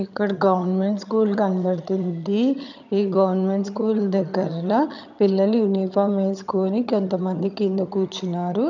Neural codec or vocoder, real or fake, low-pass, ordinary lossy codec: vocoder, 22.05 kHz, 80 mel bands, HiFi-GAN; fake; 7.2 kHz; none